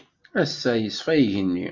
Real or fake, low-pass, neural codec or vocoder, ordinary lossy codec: real; 7.2 kHz; none; MP3, 64 kbps